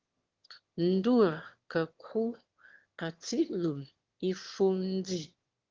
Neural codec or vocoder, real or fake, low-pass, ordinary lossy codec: autoencoder, 22.05 kHz, a latent of 192 numbers a frame, VITS, trained on one speaker; fake; 7.2 kHz; Opus, 16 kbps